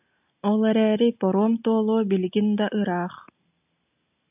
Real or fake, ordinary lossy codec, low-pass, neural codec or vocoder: real; AAC, 32 kbps; 3.6 kHz; none